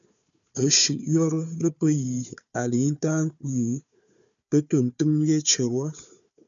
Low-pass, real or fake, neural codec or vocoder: 7.2 kHz; fake; codec, 16 kHz, 4 kbps, FunCodec, trained on Chinese and English, 50 frames a second